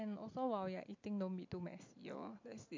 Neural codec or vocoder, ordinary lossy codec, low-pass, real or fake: none; MP3, 48 kbps; 7.2 kHz; real